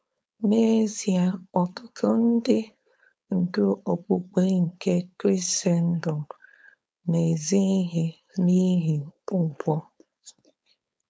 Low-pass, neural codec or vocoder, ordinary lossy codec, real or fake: none; codec, 16 kHz, 4.8 kbps, FACodec; none; fake